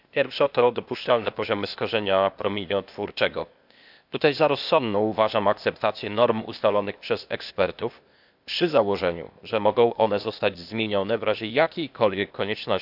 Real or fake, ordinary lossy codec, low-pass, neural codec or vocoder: fake; none; 5.4 kHz; codec, 16 kHz, 0.8 kbps, ZipCodec